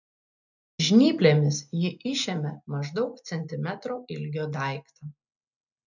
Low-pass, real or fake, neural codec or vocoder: 7.2 kHz; real; none